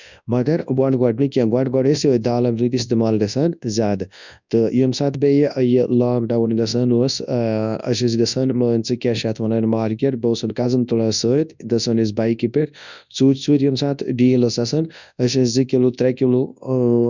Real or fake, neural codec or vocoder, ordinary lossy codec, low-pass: fake; codec, 24 kHz, 0.9 kbps, WavTokenizer, large speech release; none; 7.2 kHz